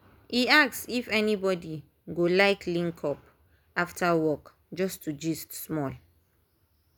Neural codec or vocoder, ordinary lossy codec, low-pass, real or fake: none; none; none; real